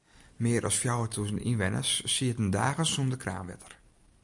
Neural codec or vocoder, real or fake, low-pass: none; real; 10.8 kHz